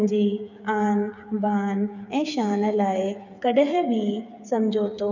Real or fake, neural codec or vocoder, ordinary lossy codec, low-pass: fake; codec, 16 kHz, 8 kbps, FreqCodec, smaller model; none; 7.2 kHz